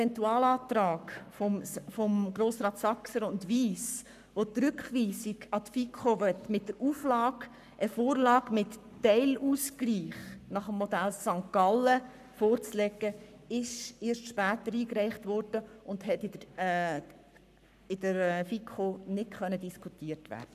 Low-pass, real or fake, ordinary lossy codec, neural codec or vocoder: 14.4 kHz; fake; none; codec, 44.1 kHz, 7.8 kbps, Pupu-Codec